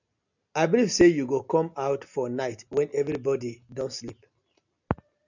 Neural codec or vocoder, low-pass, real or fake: none; 7.2 kHz; real